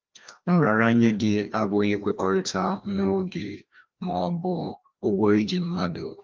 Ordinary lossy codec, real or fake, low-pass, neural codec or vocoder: Opus, 24 kbps; fake; 7.2 kHz; codec, 16 kHz, 1 kbps, FreqCodec, larger model